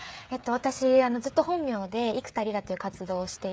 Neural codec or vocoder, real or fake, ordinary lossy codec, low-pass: codec, 16 kHz, 16 kbps, FreqCodec, smaller model; fake; none; none